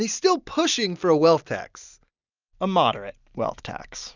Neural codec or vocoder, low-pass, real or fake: none; 7.2 kHz; real